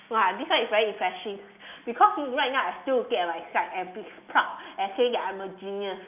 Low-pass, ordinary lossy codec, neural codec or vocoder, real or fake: 3.6 kHz; none; none; real